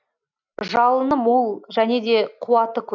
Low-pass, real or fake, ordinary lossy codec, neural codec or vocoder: 7.2 kHz; real; none; none